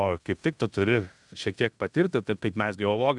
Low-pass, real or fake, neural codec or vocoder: 10.8 kHz; fake; codec, 16 kHz in and 24 kHz out, 0.9 kbps, LongCat-Audio-Codec, fine tuned four codebook decoder